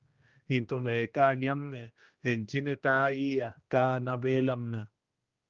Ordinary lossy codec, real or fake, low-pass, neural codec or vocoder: Opus, 32 kbps; fake; 7.2 kHz; codec, 16 kHz, 1 kbps, X-Codec, HuBERT features, trained on general audio